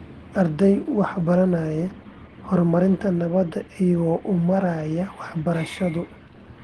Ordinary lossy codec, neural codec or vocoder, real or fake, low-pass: Opus, 16 kbps; none; real; 10.8 kHz